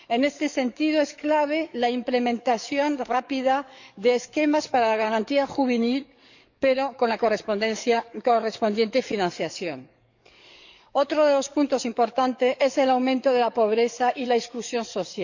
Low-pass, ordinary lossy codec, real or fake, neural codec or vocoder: 7.2 kHz; none; fake; codec, 44.1 kHz, 7.8 kbps, DAC